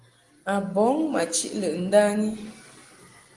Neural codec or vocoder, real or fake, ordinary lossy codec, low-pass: none; real; Opus, 24 kbps; 10.8 kHz